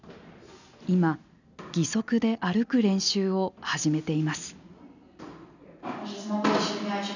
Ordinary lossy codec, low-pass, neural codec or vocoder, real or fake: none; 7.2 kHz; none; real